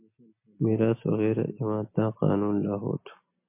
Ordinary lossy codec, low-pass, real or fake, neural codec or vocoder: MP3, 24 kbps; 3.6 kHz; real; none